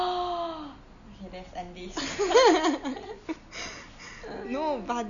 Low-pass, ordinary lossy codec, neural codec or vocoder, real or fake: 7.2 kHz; none; none; real